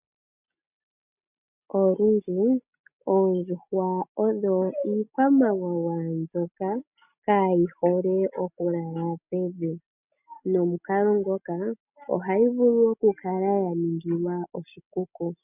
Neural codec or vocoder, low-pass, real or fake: none; 3.6 kHz; real